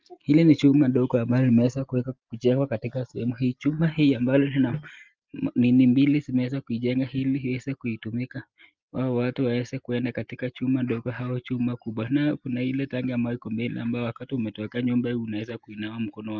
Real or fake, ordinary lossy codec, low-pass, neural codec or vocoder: real; Opus, 24 kbps; 7.2 kHz; none